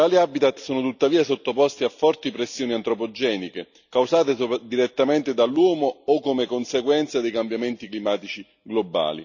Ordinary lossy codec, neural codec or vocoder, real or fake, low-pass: none; none; real; 7.2 kHz